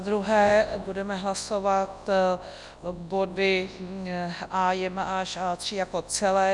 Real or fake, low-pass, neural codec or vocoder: fake; 10.8 kHz; codec, 24 kHz, 0.9 kbps, WavTokenizer, large speech release